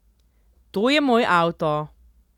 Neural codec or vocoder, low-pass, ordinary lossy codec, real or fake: none; 19.8 kHz; none; real